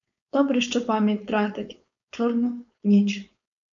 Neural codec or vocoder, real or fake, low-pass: codec, 16 kHz, 4.8 kbps, FACodec; fake; 7.2 kHz